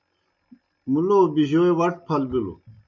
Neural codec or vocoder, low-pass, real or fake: none; 7.2 kHz; real